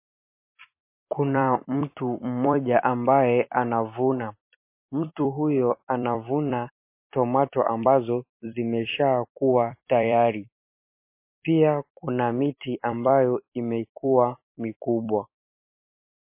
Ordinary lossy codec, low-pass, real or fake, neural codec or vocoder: MP3, 24 kbps; 3.6 kHz; fake; vocoder, 44.1 kHz, 128 mel bands every 256 samples, BigVGAN v2